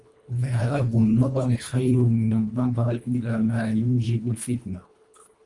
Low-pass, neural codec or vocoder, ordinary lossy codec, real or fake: 10.8 kHz; codec, 24 kHz, 1.5 kbps, HILCodec; Opus, 32 kbps; fake